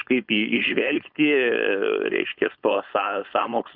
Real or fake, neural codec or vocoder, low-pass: fake; codec, 16 kHz, 4.8 kbps, FACodec; 5.4 kHz